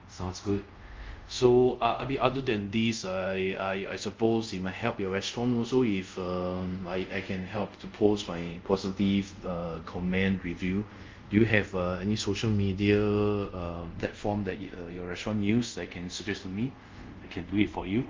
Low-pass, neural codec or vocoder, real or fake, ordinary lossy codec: 7.2 kHz; codec, 24 kHz, 0.5 kbps, DualCodec; fake; Opus, 32 kbps